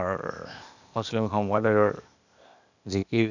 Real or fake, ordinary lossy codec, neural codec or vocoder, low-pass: fake; none; codec, 16 kHz, 0.8 kbps, ZipCodec; 7.2 kHz